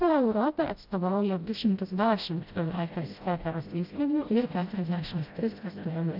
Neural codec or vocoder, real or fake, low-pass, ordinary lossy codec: codec, 16 kHz, 0.5 kbps, FreqCodec, smaller model; fake; 5.4 kHz; AAC, 48 kbps